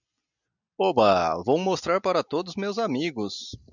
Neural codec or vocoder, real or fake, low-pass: none; real; 7.2 kHz